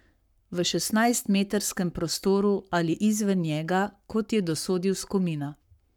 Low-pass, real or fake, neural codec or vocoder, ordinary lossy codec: 19.8 kHz; fake; codec, 44.1 kHz, 7.8 kbps, Pupu-Codec; none